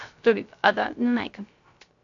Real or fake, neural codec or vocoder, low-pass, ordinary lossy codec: fake; codec, 16 kHz, 0.3 kbps, FocalCodec; 7.2 kHz; AAC, 48 kbps